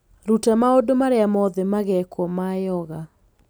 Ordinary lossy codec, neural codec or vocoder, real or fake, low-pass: none; none; real; none